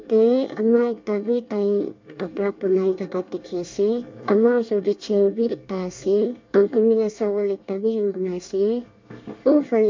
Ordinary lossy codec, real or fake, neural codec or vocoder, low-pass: MP3, 64 kbps; fake; codec, 24 kHz, 1 kbps, SNAC; 7.2 kHz